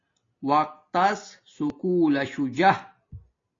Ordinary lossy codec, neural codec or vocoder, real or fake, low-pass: AAC, 32 kbps; none; real; 7.2 kHz